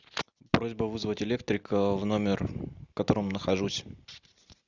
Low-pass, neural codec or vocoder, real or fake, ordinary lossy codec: 7.2 kHz; none; real; Opus, 64 kbps